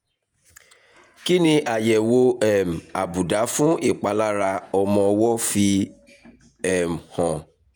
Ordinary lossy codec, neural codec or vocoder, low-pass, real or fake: none; vocoder, 48 kHz, 128 mel bands, Vocos; none; fake